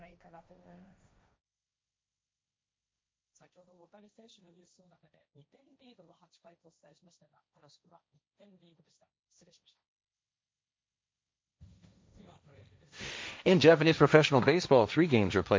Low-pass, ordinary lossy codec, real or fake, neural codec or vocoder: none; none; fake; codec, 16 kHz, 1.1 kbps, Voila-Tokenizer